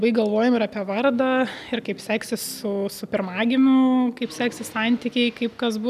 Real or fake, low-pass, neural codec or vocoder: real; 14.4 kHz; none